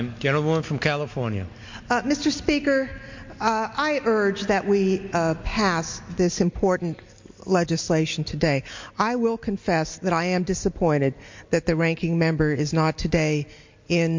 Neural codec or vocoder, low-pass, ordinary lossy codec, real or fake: none; 7.2 kHz; MP3, 48 kbps; real